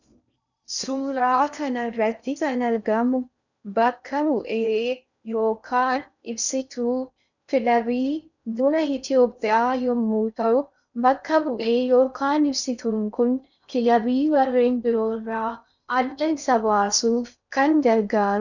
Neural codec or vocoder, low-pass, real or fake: codec, 16 kHz in and 24 kHz out, 0.6 kbps, FocalCodec, streaming, 4096 codes; 7.2 kHz; fake